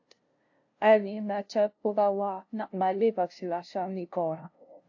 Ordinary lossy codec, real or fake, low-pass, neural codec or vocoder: AAC, 48 kbps; fake; 7.2 kHz; codec, 16 kHz, 0.5 kbps, FunCodec, trained on LibriTTS, 25 frames a second